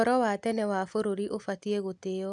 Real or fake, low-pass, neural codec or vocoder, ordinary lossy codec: real; 10.8 kHz; none; none